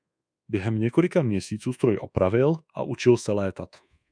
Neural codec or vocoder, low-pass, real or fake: codec, 24 kHz, 1.2 kbps, DualCodec; 9.9 kHz; fake